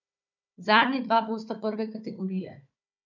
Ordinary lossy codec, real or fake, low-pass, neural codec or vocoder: none; fake; 7.2 kHz; codec, 16 kHz, 4 kbps, FunCodec, trained on Chinese and English, 50 frames a second